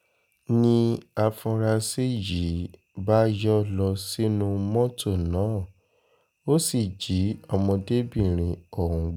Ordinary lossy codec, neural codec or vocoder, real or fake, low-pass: none; none; real; none